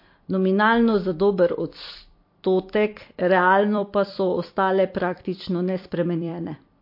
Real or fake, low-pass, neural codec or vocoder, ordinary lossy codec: fake; 5.4 kHz; vocoder, 44.1 kHz, 128 mel bands every 256 samples, BigVGAN v2; MP3, 32 kbps